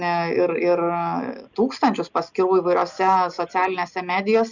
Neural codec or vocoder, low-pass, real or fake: none; 7.2 kHz; real